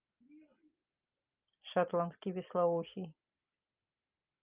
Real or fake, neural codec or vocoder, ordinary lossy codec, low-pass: real; none; Opus, 24 kbps; 3.6 kHz